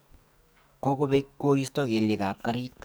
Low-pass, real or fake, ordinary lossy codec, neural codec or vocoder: none; fake; none; codec, 44.1 kHz, 2.6 kbps, SNAC